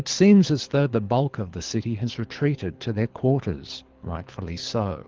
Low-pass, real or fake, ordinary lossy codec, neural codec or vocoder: 7.2 kHz; fake; Opus, 16 kbps; codec, 24 kHz, 6 kbps, HILCodec